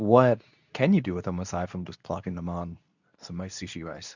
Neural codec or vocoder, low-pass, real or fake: codec, 24 kHz, 0.9 kbps, WavTokenizer, medium speech release version 2; 7.2 kHz; fake